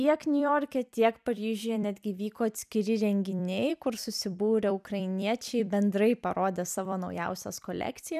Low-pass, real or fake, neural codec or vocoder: 14.4 kHz; fake; vocoder, 44.1 kHz, 128 mel bands every 256 samples, BigVGAN v2